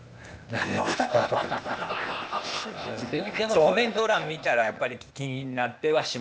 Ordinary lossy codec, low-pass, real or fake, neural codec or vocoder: none; none; fake; codec, 16 kHz, 0.8 kbps, ZipCodec